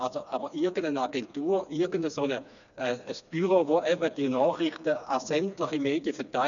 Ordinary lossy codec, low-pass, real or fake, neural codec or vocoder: none; 7.2 kHz; fake; codec, 16 kHz, 2 kbps, FreqCodec, smaller model